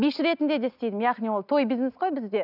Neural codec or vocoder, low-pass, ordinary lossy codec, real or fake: none; 5.4 kHz; none; real